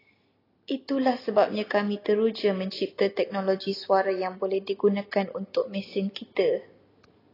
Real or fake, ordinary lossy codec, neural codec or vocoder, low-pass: real; AAC, 24 kbps; none; 5.4 kHz